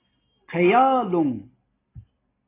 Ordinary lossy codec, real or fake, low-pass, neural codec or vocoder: AAC, 16 kbps; real; 3.6 kHz; none